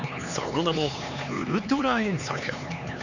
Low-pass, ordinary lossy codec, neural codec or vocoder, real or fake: 7.2 kHz; none; codec, 16 kHz, 4 kbps, X-Codec, HuBERT features, trained on LibriSpeech; fake